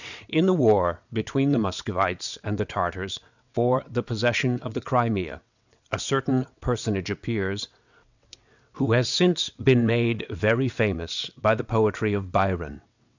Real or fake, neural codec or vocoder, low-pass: fake; vocoder, 22.05 kHz, 80 mel bands, WaveNeXt; 7.2 kHz